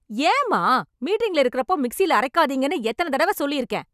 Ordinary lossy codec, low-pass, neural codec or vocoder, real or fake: none; 14.4 kHz; none; real